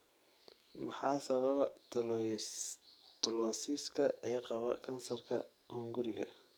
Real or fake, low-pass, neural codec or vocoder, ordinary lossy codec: fake; none; codec, 44.1 kHz, 2.6 kbps, SNAC; none